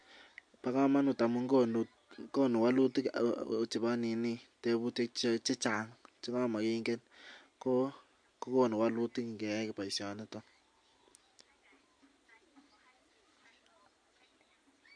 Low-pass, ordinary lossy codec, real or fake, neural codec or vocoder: 9.9 kHz; MP3, 64 kbps; real; none